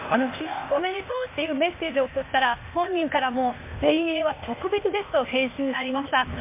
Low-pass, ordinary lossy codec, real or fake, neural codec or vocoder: 3.6 kHz; MP3, 32 kbps; fake; codec, 16 kHz, 0.8 kbps, ZipCodec